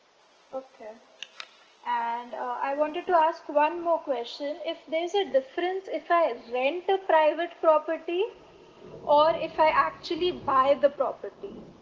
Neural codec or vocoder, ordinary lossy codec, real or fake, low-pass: none; Opus, 16 kbps; real; 7.2 kHz